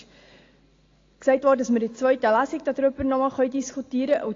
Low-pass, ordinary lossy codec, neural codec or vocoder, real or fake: 7.2 kHz; AAC, 32 kbps; none; real